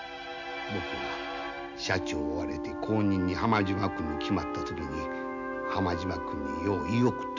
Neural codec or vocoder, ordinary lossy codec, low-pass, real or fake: none; none; 7.2 kHz; real